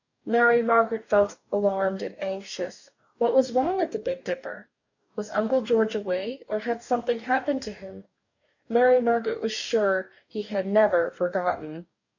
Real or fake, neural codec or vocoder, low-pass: fake; codec, 44.1 kHz, 2.6 kbps, DAC; 7.2 kHz